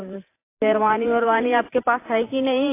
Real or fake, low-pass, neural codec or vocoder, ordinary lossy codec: fake; 3.6 kHz; vocoder, 44.1 kHz, 128 mel bands every 512 samples, BigVGAN v2; AAC, 24 kbps